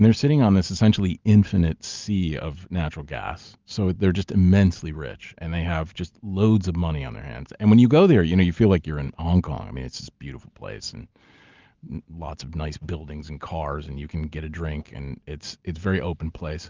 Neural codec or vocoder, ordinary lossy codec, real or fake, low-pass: none; Opus, 24 kbps; real; 7.2 kHz